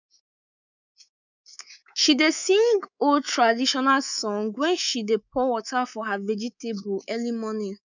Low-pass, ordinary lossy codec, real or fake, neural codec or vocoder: 7.2 kHz; none; fake; codec, 24 kHz, 3.1 kbps, DualCodec